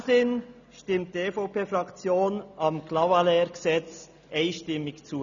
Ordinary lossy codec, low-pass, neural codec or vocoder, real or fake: none; 7.2 kHz; none; real